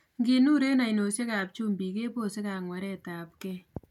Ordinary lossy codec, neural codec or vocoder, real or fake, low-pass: MP3, 96 kbps; none; real; 19.8 kHz